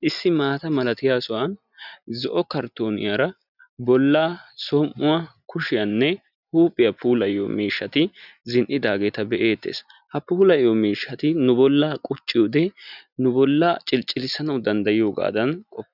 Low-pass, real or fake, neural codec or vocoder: 5.4 kHz; real; none